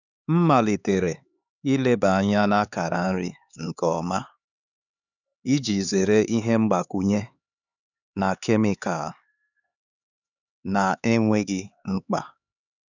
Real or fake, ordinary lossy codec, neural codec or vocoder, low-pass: fake; none; codec, 16 kHz, 4 kbps, X-Codec, HuBERT features, trained on LibriSpeech; 7.2 kHz